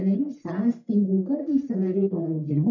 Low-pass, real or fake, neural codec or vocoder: 7.2 kHz; fake; codec, 44.1 kHz, 1.7 kbps, Pupu-Codec